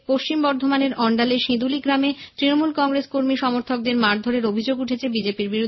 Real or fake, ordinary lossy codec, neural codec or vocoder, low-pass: real; MP3, 24 kbps; none; 7.2 kHz